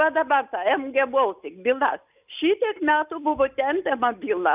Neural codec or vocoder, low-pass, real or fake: none; 3.6 kHz; real